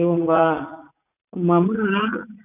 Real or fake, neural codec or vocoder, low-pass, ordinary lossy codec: fake; vocoder, 44.1 kHz, 80 mel bands, Vocos; 3.6 kHz; none